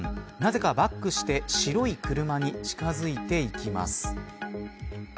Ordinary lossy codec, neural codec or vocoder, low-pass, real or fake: none; none; none; real